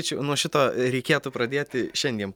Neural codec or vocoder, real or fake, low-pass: none; real; 19.8 kHz